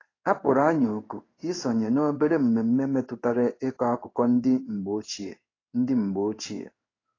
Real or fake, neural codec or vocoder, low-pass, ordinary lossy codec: fake; codec, 16 kHz in and 24 kHz out, 1 kbps, XY-Tokenizer; 7.2 kHz; AAC, 32 kbps